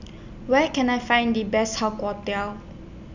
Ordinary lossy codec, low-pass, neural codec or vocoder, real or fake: none; 7.2 kHz; none; real